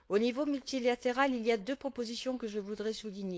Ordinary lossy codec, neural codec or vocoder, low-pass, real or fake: none; codec, 16 kHz, 4.8 kbps, FACodec; none; fake